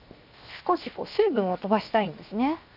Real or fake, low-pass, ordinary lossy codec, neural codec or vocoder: fake; 5.4 kHz; none; codec, 16 kHz, 0.7 kbps, FocalCodec